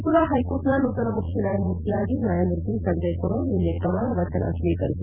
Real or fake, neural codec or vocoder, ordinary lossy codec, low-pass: fake; vocoder, 22.05 kHz, 80 mel bands, Vocos; none; 3.6 kHz